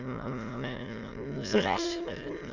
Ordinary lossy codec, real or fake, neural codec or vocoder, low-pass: none; fake; autoencoder, 22.05 kHz, a latent of 192 numbers a frame, VITS, trained on many speakers; 7.2 kHz